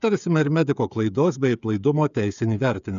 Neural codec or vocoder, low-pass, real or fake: codec, 16 kHz, 8 kbps, FreqCodec, smaller model; 7.2 kHz; fake